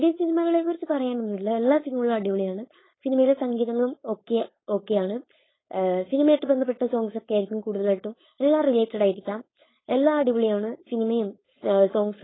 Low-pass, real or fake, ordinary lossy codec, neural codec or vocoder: 7.2 kHz; fake; AAC, 16 kbps; codec, 16 kHz, 4.8 kbps, FACodec